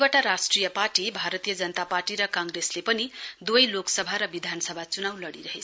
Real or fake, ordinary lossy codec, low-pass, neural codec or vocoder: real; none; none; none